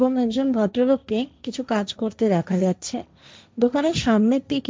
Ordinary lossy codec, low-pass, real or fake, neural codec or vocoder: none; none; fake; codec, 16 kHz, 1.1 kbps, Voila-Tokenizer